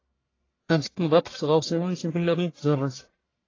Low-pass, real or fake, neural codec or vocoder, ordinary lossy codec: 7.2 kHz; fake; codec, 44.1 kHz, 1.7 kbps, Pupu-Codec; AAC, 32 kbps